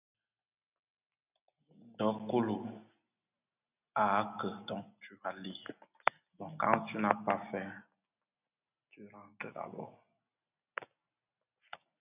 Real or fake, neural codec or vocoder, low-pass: real; none; 3.6 kHz